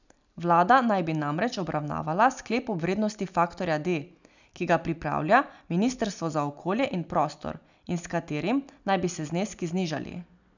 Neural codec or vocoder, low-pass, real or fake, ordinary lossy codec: none; 7.2 kHz; real; none